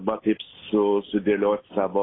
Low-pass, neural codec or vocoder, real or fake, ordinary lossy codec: 7.2 kHz; none; real; AAC, 16 kbps